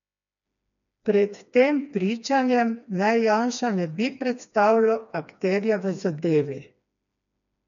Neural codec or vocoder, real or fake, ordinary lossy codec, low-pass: codec, 16 kHz, 2 kbps, FreqCodec, smaller model; fake; none; 7.2 kHz